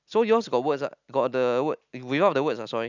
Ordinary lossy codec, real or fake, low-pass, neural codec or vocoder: none; real; 7.2 kHz; none